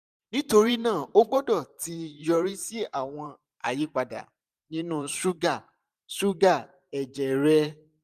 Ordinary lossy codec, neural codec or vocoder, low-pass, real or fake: none; vocoder, 44.1 kHz, 128 mel bands every 512 samples, BigVGAN v2; 14.4 kHz; fake